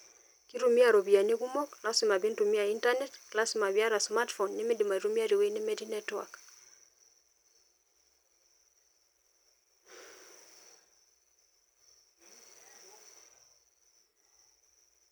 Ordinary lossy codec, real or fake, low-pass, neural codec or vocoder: none; real; none; none